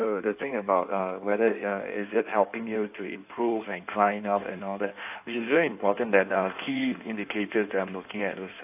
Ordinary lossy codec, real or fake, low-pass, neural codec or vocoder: AAC, 32 kbps; fake; 3.6 kHz; codec, 16 kHz in and 24 kHz out, 1.1 kbps, FireRedTTS-2 codec